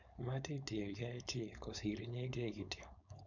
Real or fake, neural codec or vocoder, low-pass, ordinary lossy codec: fake; codec, 16 kHz, 4.8 kbps, FACodec; 7.2 kHz; none